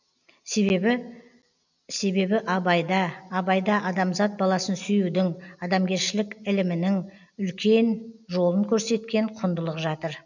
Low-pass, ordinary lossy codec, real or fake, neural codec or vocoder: 7.2 kHz; none; real; none